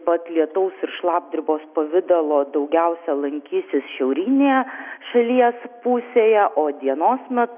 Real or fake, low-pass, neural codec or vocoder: real; 3.6 kHz; none